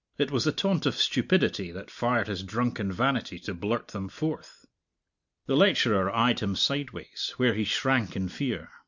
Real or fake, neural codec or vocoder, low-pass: real; none; 7.2 kHz